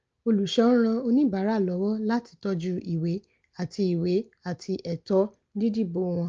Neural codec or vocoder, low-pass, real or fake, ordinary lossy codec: none; 7.2 kHz; real; Opus, 24 kbps